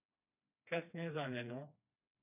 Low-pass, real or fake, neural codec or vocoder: 3.6 kHz; fake; codec, 16 kHz, 2 kbps, FreqCodec, smaller model